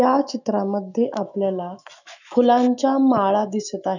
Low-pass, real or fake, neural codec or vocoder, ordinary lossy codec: 7.2 kHz; fake; autoencoder, 48 kHz, 128 numbers a frame, DAC-VAE, trained on Japanese speech; none